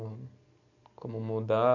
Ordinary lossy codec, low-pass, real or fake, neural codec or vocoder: none; 7.2 kHz; real; none